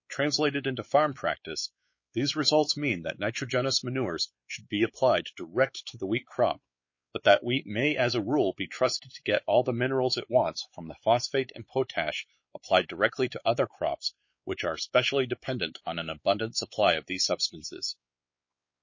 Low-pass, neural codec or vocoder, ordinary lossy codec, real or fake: 7.2 kHz; codec, 16 kHz, 4 kbps, X-Codec, WavLM features, trained on Multilingual LibriSpeech; MP3, 32 kbps; fake